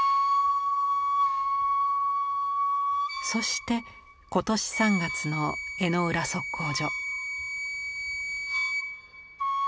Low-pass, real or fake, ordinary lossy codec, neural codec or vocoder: none; real; none; none